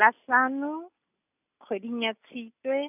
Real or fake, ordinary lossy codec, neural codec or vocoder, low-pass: real; none; none; 3.6 kHz